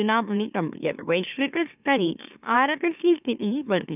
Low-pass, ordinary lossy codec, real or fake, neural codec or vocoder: 3.6 kHz; none; fake; autoencoder, 44.1 kHz, a latent of 192 numbers a frame, MeloTTS